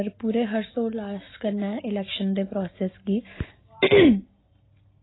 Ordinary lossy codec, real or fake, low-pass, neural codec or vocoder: AAC, 16 kbps; real; 7.2 kHz; none